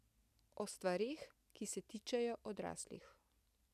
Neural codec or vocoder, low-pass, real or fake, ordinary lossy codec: none; 14.4 kHz; real; none